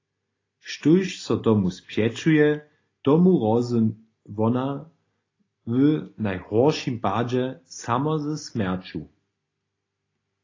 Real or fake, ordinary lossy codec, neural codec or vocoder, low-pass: real; AAC, 32 kbps; none; 7.2 kHz